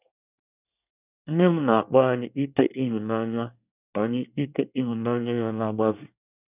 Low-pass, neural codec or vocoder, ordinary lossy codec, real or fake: 3.6 kHz; codec, 24 kHz, 1 kbps, SNAC; none; fake